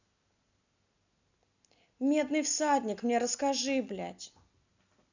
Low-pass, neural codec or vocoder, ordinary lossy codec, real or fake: 7.2 kHz; none; none; real